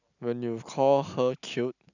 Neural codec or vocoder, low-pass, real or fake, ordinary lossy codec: none; 7.2 kHz; real; none